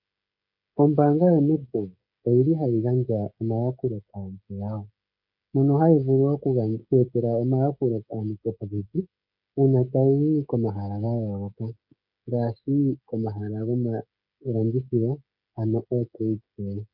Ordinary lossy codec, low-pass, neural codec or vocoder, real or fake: MP3, 48 kbps; 5.4 kHz; codec, 16 kHz, 16 kbps, FreqCodec, smaller model; fake